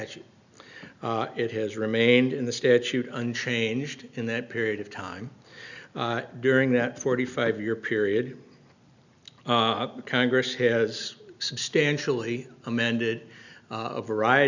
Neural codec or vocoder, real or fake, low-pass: none; real; 7.2 kHz